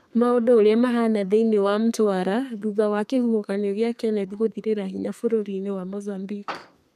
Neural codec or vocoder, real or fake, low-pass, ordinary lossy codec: codec, 32 kHz, 1.9 kbps, SNAC; fake; 14.4 kHz; none